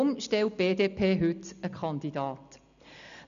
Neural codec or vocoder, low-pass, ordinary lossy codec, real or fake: none; 7.2 kHz; none; real